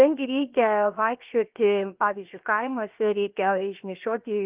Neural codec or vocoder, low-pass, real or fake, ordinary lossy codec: codec, 16 kHz, 0.7 kbps, FocalCodec; 3.6 kHz; fake; Opus, 32 kbps